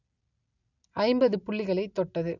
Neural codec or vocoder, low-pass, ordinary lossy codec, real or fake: none; 7.2 kHz; none; real